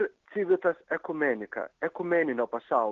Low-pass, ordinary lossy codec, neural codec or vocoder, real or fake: 7.2 kHz; Opus, 16 kbps; none; real